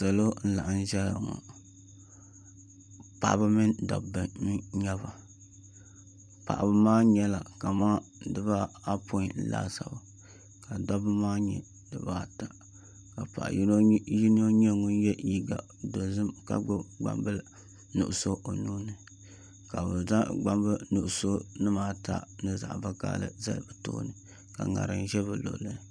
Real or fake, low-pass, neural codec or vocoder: real; 9.9 kHz; none